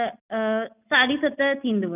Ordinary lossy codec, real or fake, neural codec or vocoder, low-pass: none; real; none; 3.6 kHz